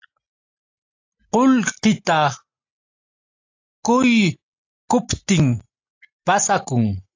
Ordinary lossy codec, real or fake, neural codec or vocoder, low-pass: AAC, 48 kbps; real; none; 7.2 kHz